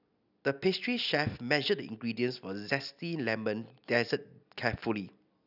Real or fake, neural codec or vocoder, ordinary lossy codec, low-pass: real; none; none; 5.4 kHz